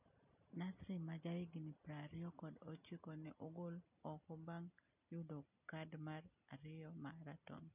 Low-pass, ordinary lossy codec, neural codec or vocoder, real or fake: 3.6 kHz; none; none; real